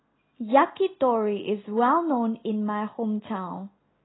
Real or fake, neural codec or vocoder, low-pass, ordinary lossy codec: real; none; 7.2 kHz; AAC, 16 kbps